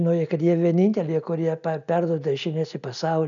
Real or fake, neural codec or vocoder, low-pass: real; none; 7.2 kHz